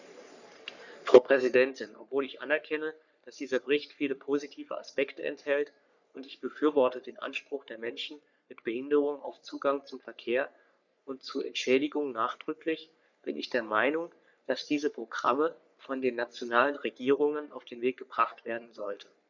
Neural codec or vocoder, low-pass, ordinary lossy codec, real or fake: codec, 44.1 kHz, 3.4 kbps, Pupu-Codec; 7.2 kHz; none; fake